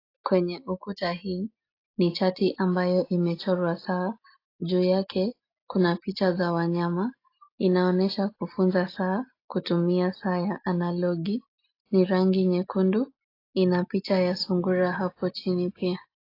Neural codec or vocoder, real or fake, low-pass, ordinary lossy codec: none; real; 5.4 kHz; AAC, 32 kbps